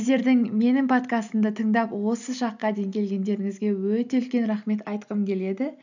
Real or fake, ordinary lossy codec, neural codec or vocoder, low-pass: real; none; none; 7.2 kHz